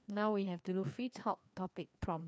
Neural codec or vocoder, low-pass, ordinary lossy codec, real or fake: codec, 16 kHz, 2 kbps, FreqCodec, larger model; none; none; fake